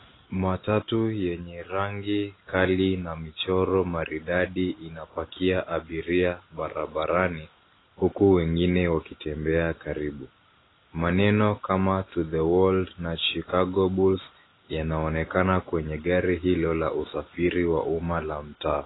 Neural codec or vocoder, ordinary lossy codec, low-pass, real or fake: none; AAC, 16 kbps; 7.2 kHz; real